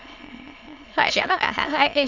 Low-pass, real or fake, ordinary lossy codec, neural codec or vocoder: 7.2 kHz; fake; none; autoencoder, 22.05 kHz, a latent of 192 numbers a frame, VITS, trained on many speakers